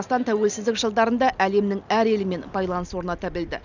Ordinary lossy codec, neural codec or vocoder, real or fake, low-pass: none; none; real; 7.2 kHz